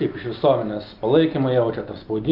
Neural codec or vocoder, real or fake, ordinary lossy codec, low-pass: none; real; Opus, 24 kbps; 5.4 kHz